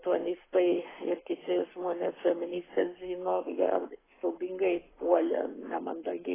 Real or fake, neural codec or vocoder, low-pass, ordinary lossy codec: fake; codec, 44.1 kHz, 7.8 kbps, Pupu-Codec; 3.6 kHz; AAC, 16 kbps